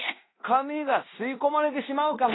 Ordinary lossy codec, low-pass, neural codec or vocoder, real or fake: AAC, 16 kbps; 7.2 kHz; codec, 16 kHz in and 24 kHz out, 0.9 kbps, LongCat-Audio-Codec, fine tuned four codebook decoder; fake